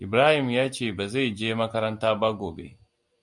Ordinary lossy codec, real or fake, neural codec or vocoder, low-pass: MP3, 96 kbps; real; none; 10.8 kHz